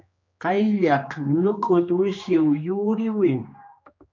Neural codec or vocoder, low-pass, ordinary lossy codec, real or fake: codec, 16 kHz, 2 kbps, X-Codec, HuBERT features, trained on general audio; 7.2 kHz; MP3, 64 kbps; fake